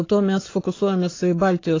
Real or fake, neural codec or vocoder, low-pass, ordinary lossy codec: fake; codec, 44.1 kHz, 7.8 kbps, Pupu-Codec; 7.2 kHz; AAC, 32 kbps